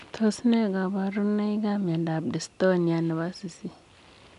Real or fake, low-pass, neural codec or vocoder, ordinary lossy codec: real; 10.8 kHz; none; none